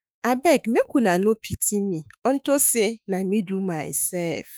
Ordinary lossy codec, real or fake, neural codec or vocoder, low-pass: none; fake; autoencoder, 48 kHz, 32 numbers a frame, DAC-VAE, trained on Japanese speech; none